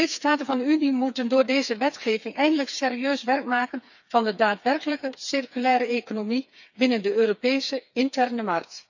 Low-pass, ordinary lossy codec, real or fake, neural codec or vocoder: 7.2 kHz; none; fake; codec, 16 kHz, 4 kbps, FreqCodec, smaller model